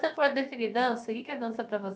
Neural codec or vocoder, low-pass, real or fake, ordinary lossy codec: codec, 16 kHz, about 1 kbps, DyCAST, with the encoder's durations; none; fake; none